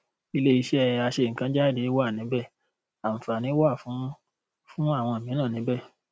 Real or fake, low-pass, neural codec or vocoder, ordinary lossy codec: real; none; none; none